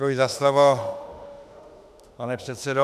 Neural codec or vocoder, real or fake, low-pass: autoencoder, 48 kHz, 32 numbers a frame, DAC-VAE, trained on Japanese speech; fake; 14.4 kHz